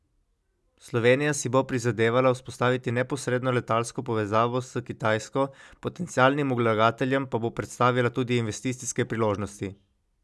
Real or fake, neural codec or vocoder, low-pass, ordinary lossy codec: real; none; none; none